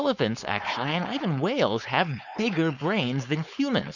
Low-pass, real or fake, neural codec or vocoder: 7.2 kHz; fake; codec, 16 kHz, 4.8 kbps, FACodec